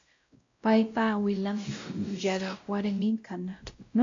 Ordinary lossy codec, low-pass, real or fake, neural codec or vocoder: AAC, 48 kbps; 7.2 kHz; fake; codec, 16 kHz, 0.5 kbps, X-Codec, WavLM features, trained on Multilingual LibriSpeech